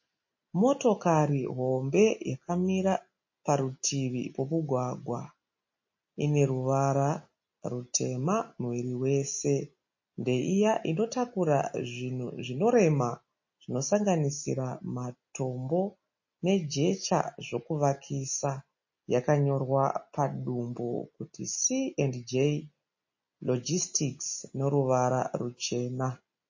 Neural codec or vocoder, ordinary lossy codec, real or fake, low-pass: none; MP3, 32 kbps; real; 7.2 kHz